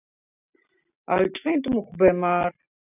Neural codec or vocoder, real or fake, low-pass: none; real; 3.6 kHz